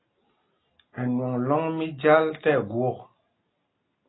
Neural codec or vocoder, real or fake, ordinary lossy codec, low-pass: none; real; AAC, 16 kbps; 7.2 kHz